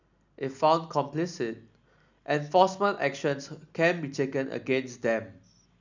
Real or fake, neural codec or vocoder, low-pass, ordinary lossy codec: real; none; 7.2 kHz; none